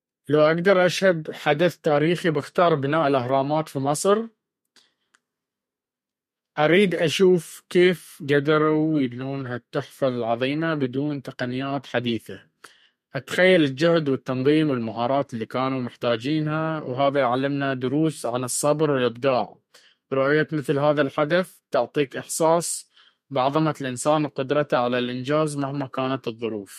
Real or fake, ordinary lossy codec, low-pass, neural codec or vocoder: fake; MP3, 64 kbps; 14.4 kHz; codec, 32 kHz, 1.9 kbps, SNAC